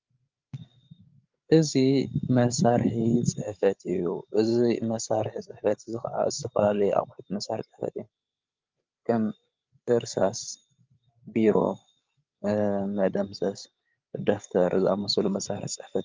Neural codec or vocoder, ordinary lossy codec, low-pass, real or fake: codec, 16 kHz, 8 kbps, FreqCodec, larger model; Opus, 24 kbps; 7.2 kHz; fake